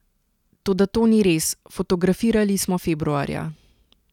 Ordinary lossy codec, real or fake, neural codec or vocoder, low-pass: none; real; none; 19.8 kHz